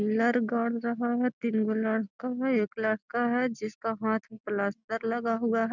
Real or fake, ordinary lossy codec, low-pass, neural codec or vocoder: real; none; 7.2 kHz; none